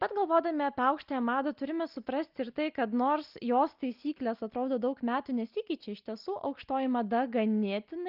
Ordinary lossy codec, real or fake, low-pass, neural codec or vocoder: Opus, 32 kbps; real; 5.4 kHz; none